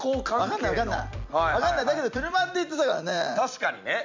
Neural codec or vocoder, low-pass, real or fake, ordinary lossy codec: vocoder, 44.1 kHz, 128 mel bands every 256 samples, BigVGAN v2; 7.2 kHz; fake; MP3, 64 kbps